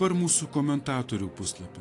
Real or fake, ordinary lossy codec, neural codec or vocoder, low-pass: real; AAC, 48 kbps; none; 10.8 kHz